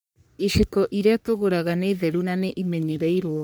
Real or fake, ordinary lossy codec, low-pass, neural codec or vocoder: fake; none; none; codec, 44.1 kHz, 3.4 kbps, Pupu-Codec